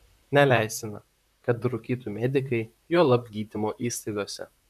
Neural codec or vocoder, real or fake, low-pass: vocoder, 44.1 kHz, 128 mel bands, Pupu-Vocoder; fake; 14.4 kHz